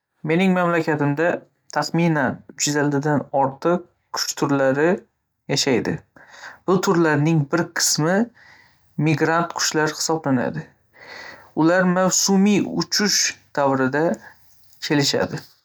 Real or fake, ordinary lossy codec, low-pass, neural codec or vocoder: real; none; none; none